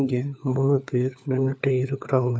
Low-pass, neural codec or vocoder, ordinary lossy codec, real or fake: none; codec, 16 kHz, 4 kbps, FunCodec, trained on LibriTTS, 50 frames a second; none; fake